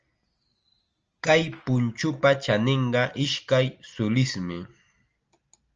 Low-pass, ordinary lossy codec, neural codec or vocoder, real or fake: 7.2 kHz; Opus, 24 kbps; none; real